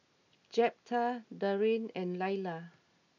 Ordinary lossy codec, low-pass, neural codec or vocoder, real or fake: none; 7.2 kHz; none; real